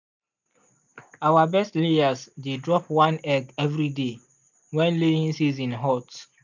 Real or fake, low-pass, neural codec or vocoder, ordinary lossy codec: real; 7.2 kHz; none; none